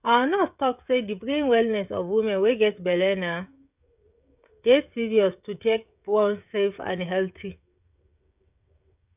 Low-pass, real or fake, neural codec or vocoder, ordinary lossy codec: 3.6 kHz; fake; codec, 16 kHz, 16 kbps, FreqCodec, smaller model; none